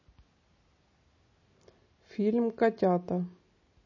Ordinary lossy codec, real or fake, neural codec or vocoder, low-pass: MP3, 32 kbps; real; none; 7.2 kHz